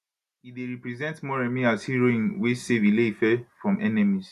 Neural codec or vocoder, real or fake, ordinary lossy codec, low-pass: none; real; none; 14.4 kHz